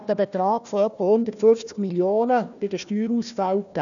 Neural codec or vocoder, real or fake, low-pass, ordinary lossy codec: codec, 16 kHz, 1 kbps, FunCodec, trained on Chinese and English, 50 frames a second; fake; 7.2 kHz; none